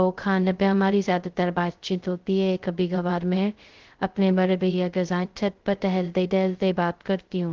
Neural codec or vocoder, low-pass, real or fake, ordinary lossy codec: codec, 16 kHz, 0.2 kbps, FocalCodec; 7.2 kHz; fake; Opus, 16 kbps